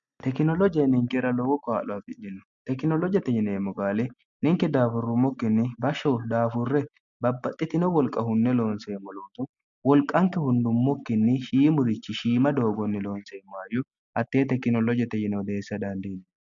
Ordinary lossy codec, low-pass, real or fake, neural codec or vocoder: MP3, 96 kbps; 7.2 kHz; real; none